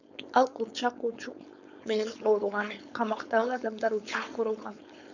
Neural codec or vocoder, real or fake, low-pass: codec, 16 kHz, 4.8 kbps, FACodec; fake; 7.2 kHz